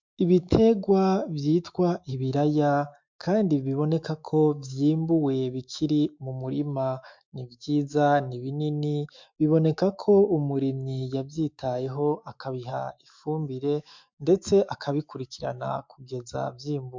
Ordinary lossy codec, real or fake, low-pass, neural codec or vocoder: MP3, 64 kbps; fake; 7.2 kHz; autoencoder, 48 kHz, 128 numbers a frame, DAC-VAE, trained on Japanese speech